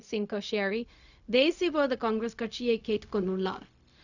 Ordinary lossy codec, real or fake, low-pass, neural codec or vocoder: none; fake; 7.2 kHz; codec, 16 kHz, 0.4 kbps, LongCat-Audio-Codec